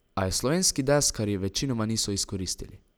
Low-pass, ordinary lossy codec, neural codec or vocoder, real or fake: none; none; none; real